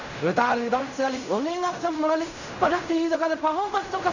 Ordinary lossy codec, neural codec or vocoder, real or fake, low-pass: none; codec, 16 kHz in and 24 kHz out, 0.4 kbps, LongCat-Audio-Codec, fine tuned four codebook decoder; fake; 7.2 kHz